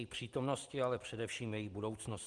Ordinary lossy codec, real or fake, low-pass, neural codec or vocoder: Opus, 24 kbps; real; 10.8 kHz; none